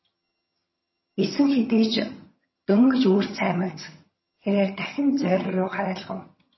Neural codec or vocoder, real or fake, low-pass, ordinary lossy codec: vocoder, 22.05 kHz, 80 mel bands, HiFi-GAN; fake; 7.2 kHz; MP3, 24 kbps